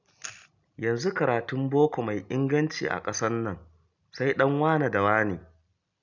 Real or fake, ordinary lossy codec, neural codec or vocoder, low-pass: real; none; none; 7.2 kHz